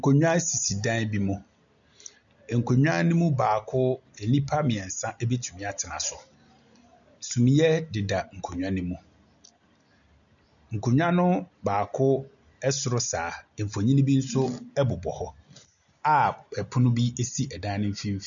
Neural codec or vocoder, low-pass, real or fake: none; 7.2 kHz; real